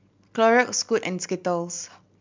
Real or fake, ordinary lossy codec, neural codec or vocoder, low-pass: real; MP3, 64 kbps; none; 7.2 kHz